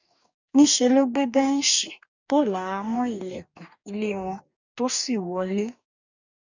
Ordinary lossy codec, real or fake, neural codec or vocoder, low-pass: none; fake; codec, 44.1 kHz, 2.6 kbps, DAC; 7.2 kHz